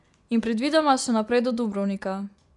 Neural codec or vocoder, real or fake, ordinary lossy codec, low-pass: vocoder, 24 kHz, 100 mel bands, Vocos; fake; none; 10.8 kHz